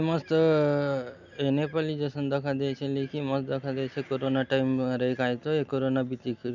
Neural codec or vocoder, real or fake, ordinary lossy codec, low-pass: none; real; none; 7.2 kHz